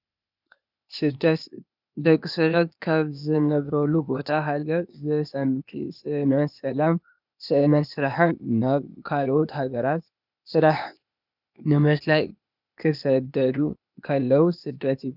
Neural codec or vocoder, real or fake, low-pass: codec, 16 kHz, 0.8 kbps, ZipCodec; fake; 5.4 kHz